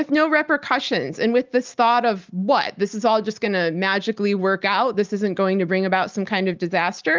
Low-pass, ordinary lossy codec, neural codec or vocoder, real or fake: 7.2 kHz; Opus, 32 kbps; none; real